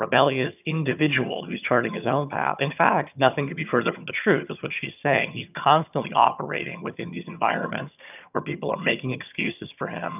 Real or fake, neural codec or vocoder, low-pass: fake; vocoder, 22.05 kHz, 80 mel bands, HiFi-GAN; 3.6 kHz